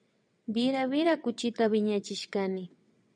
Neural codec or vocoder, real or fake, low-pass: vocoder, 22.05 kHz, 80 mel bands, WaveNeXt; fake; 9.9 kHz